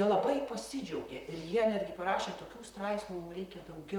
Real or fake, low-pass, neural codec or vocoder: fake; 19.8 kHz; vocoder, 44.1 kHz, 128 mel bands, Pupu-Vocoder